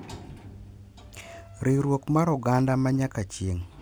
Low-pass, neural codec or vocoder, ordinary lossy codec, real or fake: none; none; none; real